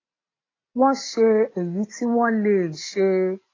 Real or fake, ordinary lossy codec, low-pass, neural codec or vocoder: real; AAC, 32 kbps; 7.2 kHz; none